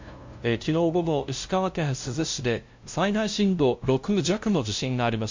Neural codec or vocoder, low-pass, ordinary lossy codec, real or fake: codec, 16 kHz, 0.5 kbps, FunCodec, trained on LibriTTS, 25 frames a second; 7.2 kHz; MP3, 48 kbps; fake